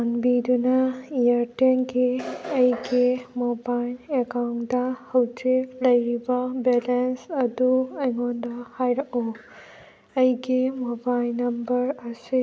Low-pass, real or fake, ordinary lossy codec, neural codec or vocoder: none; real; none; none